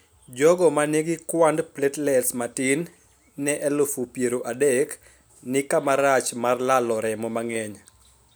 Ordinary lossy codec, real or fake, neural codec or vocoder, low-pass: none; real; none; none